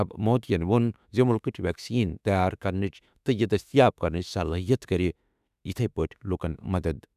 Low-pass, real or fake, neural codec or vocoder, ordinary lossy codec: 14.4 kHz; fake; autoencoder, 48 kHz, 32 numbers a frame, DAC-VAE, trained on Japanese speech; none